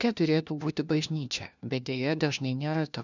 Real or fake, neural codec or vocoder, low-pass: fake; codec, 16 kHz, 1 kbps, FunCodec, trained on LibriTTS, 50 frames a second; 7.2 kHz